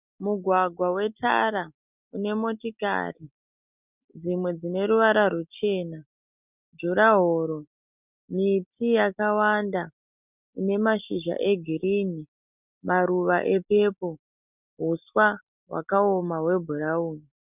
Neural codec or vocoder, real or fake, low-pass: none; real; 3.6 kHz